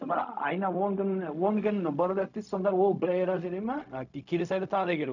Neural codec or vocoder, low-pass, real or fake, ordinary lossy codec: codec, 16 kHz, 0.4 kbps, LongCat-Audio-Codec; 7.2 kHz; fake; none